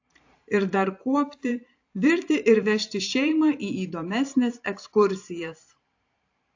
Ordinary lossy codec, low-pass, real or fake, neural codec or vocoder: AAC, 48 kbps; 7.2 kHz; fake; vocoder, 44.1 kHz, 128 mel bands every 512 samples, BigVGAN v2